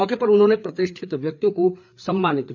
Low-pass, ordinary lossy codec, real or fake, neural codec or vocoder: 7.2 kHz; none; fake; codec, 16 kHz, 4 kbps, FreqCodec, larger model